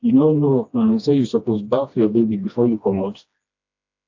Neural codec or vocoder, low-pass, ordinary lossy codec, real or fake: codec, 16 kHz, 1 kbps, FreqCodec, smaller model; 7.2 kHz; AAC, 48 kbps; fake